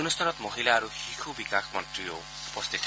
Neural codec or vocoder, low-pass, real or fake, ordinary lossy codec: none; none; real; none